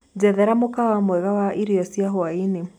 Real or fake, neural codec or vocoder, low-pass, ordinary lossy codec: real; none; 19.8 kHz; none